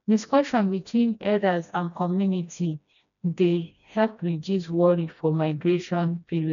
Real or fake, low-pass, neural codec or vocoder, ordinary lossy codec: fake; 7.2 kHz; codec, 16 kHz, 1 kbps, FreqCodec, smaller model; none